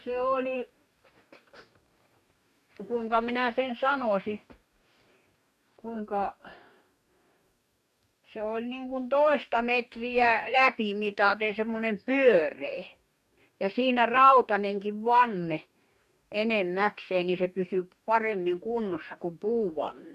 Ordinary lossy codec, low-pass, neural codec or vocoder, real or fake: none; 14.4 kHz; codec, 44.1 kHz, 2.6 kbps, DAC; fake